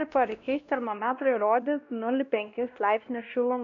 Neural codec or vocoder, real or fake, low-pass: codec, 16 kHz, 1 kbps, X-Codec, WavLM features, trained on Multilingual LibriSpeech; fake; 7.2 kHz